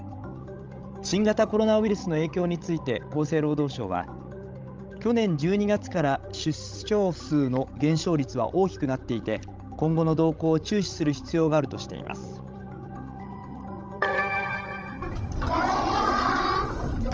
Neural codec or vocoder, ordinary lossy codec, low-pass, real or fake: codec, 16 kHz, 16 kbps, FreqCodec, larger model; Opus, 24 kbps; 7.2 kHz; fake